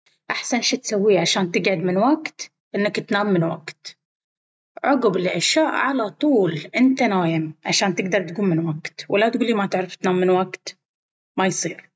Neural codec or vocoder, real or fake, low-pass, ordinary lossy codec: none; real; none; none